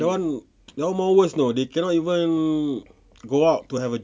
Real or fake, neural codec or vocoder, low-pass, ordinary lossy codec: real; none; none; none